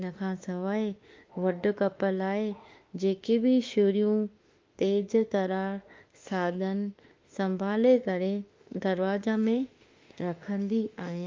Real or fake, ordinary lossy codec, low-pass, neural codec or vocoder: fake; Opus, 32 kbps; 7.2 kHz; autoencoder, 48 kHz, 32 numbers a frame, DAC-VAE, trained on Japanese speech